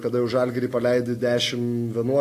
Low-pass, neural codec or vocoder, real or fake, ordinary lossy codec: 14.4 kHz; none; real; AAC, 48 kbps